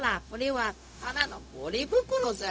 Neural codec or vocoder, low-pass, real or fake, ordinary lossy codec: codec, 16 kHz, 0.4 kbps, LongCat-Audio-Codec; none; fake; none